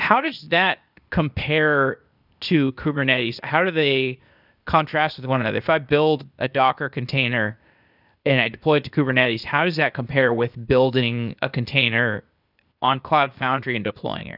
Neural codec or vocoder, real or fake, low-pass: codec, 16 kHz, 0.8 kbps, ZipCodec; fake; 5.4 kHz